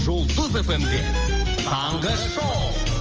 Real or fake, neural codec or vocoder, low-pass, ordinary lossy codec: real; none; 7.2 kHz; Opus, 24 kbps